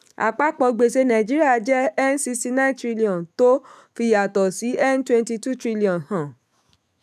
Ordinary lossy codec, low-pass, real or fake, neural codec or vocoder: none; 14.4 kHz; fake; autoencoder, 48 kHz, 128 numbers a frame, DAC-VAE, trained on Japanese speech